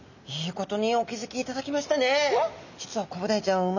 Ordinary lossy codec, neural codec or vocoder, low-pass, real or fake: none; none; 7.2 kHz; real